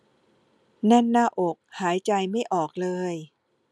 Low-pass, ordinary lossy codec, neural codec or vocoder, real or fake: none; none; none; real